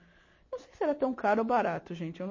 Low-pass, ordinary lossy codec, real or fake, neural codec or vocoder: 7.2 kHz; MP3, 32 kbps; real; none